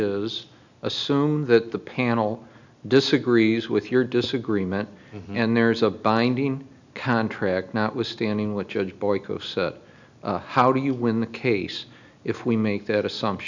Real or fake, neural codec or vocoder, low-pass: real; none; 7.2 kHz